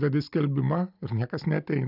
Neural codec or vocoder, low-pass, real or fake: none; 5.4 kHz; real